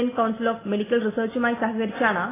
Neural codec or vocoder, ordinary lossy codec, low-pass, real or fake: none; AAC, 16 kbps; 3.6 kHz; real